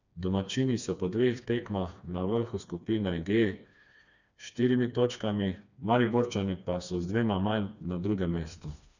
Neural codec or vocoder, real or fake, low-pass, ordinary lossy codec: codec, 16 kHz, 2 kbps, FreqCodec, smaller model; fake; 7.2 kHz; none